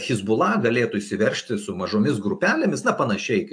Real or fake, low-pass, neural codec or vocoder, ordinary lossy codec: real; 9.9 kHz; none; AAC, 64 kbps